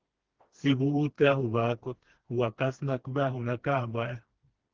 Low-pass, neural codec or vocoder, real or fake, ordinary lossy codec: 7.2 kHz; codec, 16 kHz, 2 kbps, FreqCodec, smaller model; fake; Opus, 16 kbps